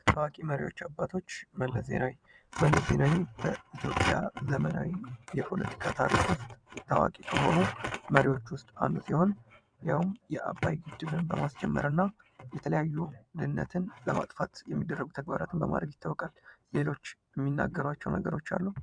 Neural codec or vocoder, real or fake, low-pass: vocoder, 22.05 kHz, 80 mel bands, WaveNeXt; fake; 9.9 kHz